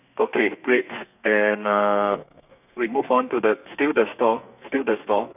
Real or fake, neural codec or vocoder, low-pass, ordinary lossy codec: fake; codec, 32 kHz, 1.9 kbps, SNAC; 3.6 kHz; none